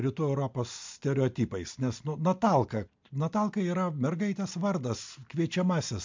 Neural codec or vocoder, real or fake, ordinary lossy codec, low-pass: none; real; MP3, 64 kbps; 7.2 kHz